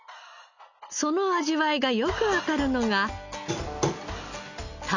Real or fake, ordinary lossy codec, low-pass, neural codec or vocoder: real; none; 7.2 kHz; none